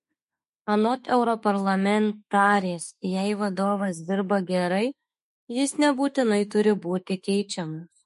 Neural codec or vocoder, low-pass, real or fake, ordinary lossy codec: autoencoder, 48 kHz, 32 numbers a frame, DAC-VAE, trained on Japanese speech; 14.4 kHz; fake; MP3, 48 kbps